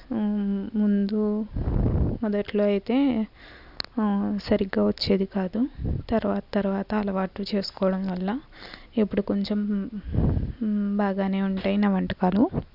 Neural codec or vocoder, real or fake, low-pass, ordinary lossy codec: none; real; 5.4 kHz; none